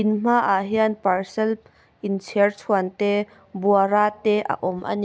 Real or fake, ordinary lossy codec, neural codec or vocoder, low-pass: real; none; none; none